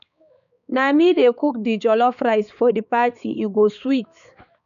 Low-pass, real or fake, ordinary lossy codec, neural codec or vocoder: 7.2 kHz; fake; none; codec, 16 kHz, 4 kbps, X-Codec, HuBERT features, trained on balanced general audio